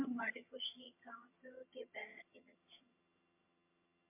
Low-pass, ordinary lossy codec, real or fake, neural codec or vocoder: 3.6 kHz; MP3, 24 kbps; fake; vocoder, 22.05 kHz, 80 mel bands, HiFi-GAN